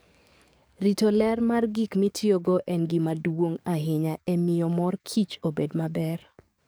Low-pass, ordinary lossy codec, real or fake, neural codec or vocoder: none; none; fake; codec, 44.1 kHz, 7.8 kbps, DAC